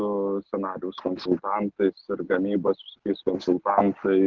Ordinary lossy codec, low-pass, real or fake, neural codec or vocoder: Opus, 16 kbps; 7.2 kHz; real; none